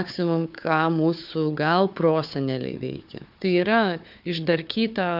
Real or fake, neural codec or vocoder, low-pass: fake; codec, 16 kHz, 4 kbps, FunCodec, trained on Chinese and English, 50 frames a second; 5.4 kHz